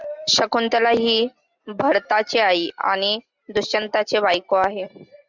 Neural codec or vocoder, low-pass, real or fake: none; 7.2 kHz; real